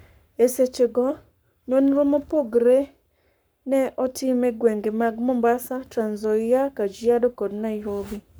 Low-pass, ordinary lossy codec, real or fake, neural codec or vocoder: none; none; fake; codec, 44.1 kHz, 7.8 kbps, Pupu-Codec